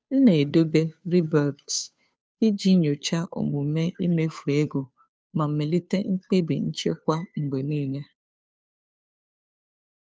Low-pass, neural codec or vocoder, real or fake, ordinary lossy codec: none; codec, 16 kHz, 2 kbps, FunCodec, trained on Chinese and English, 25 frames a second; fake; none